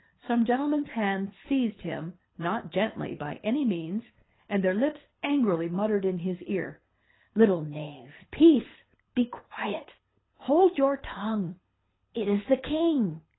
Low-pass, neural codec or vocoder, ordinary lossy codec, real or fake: 7.2 kHz; codec, 24 kHz, 6 kbps, HILCodec; AAC, 16 kbps; fake